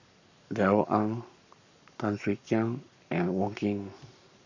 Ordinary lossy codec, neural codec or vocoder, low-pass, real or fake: none; codec, 44.1 kHz, 7.8 kbps, Pupu-Codec; 7.2 kHz; fake